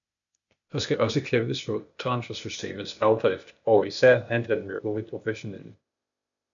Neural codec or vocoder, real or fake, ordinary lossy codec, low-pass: codec, 16 kHz, 0.8 kbps, ZipCodec; fake; MP3, 96 kbps; 7.2 kHz